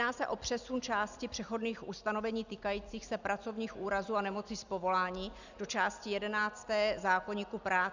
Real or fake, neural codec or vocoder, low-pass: real; none; 7.2 kHz